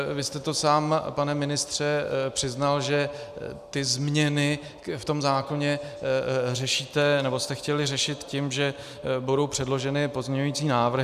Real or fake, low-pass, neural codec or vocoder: real; 14.4 kHz; none